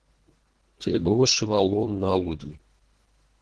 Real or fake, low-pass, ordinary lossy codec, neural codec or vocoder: fake; 10.8 kHz; Opus, 16 kbps; codec, 24 kHz, 1.5 kbps, HILCodec